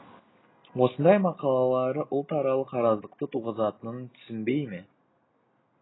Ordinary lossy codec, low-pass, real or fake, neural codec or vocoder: AAC, 16 kbps; 7.2 kHz; real; none